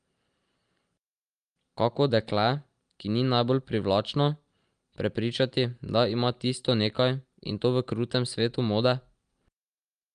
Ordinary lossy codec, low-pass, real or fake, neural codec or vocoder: Opus, 32 kbps; 9.9 kHz; real; none